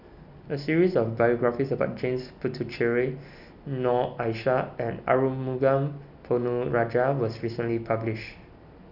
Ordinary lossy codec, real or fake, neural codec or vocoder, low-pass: none; real; none; 5.4 kHz